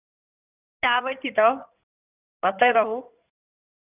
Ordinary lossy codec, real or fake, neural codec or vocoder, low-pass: none; fake; codec, 16 kHz in and 24 kHz out, 2.2 kbps, FireRedTTS-2 codec; 3.6 kHz